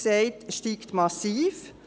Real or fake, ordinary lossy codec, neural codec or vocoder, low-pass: real; none; none; none